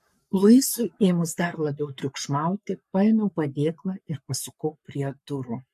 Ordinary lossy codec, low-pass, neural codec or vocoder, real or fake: MP3, 64 kbps; 14.4 kHz; codec, 44.1 kHz, 7.8 kbps, Pupu-Codec; fake